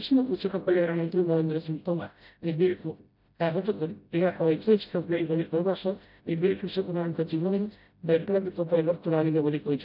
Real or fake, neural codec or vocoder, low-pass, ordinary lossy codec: fake; codec, 16 kHz, 0.5 kbps, FreqCodec, smaller model; 5.4 kHz; none